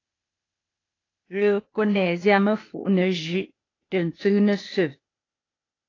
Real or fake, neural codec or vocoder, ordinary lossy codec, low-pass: fake; codec, 16 kHz, 0.8 kbps, ZipCodec; AAC, 32 kbps; 7.2 kHz